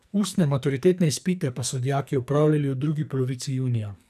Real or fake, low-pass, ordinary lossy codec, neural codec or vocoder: fake; 14.4 kHz; none; codec, 44.1 kHz, 2.6 kbps, SNAC